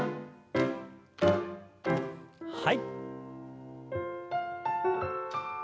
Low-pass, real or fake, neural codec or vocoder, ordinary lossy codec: none; real; none; none